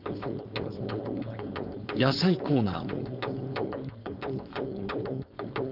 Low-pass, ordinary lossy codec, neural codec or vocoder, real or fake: 5.4 kHz; none; codec, 16 kHz, 4.8 kbps, FACodec; fake